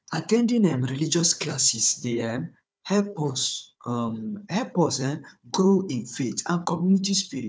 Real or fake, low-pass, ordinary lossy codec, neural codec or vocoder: fake; none; none; codec, 16 kHz, 4 kbps, FunCodec, trained on Chinese and English, 50 frames a second